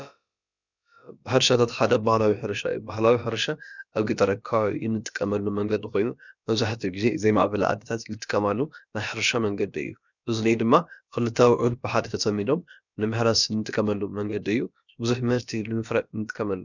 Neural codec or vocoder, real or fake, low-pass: codec, 16 kHz, about 1 kbps, DyCAST, with the encoder's durations; fake; 7.2 kHz